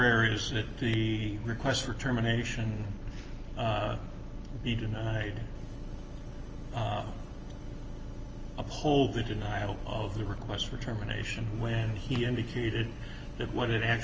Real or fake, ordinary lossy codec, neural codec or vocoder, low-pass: real; Opus, 24 kbps; none; 7.2 kHz